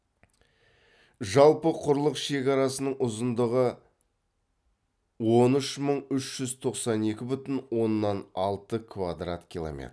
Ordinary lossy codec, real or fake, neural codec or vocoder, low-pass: none; real; none; none